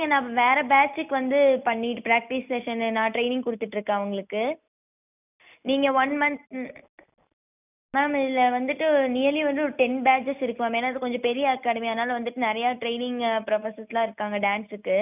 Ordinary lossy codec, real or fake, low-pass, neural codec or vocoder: none; real; 3.6 kHz; none